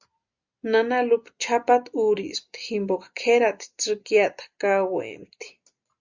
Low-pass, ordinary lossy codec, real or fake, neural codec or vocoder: 7.2 kHz; Opus, 64 kbps; real; none